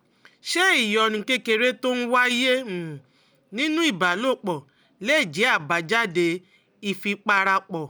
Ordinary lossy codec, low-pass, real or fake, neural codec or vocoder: none; none; real; none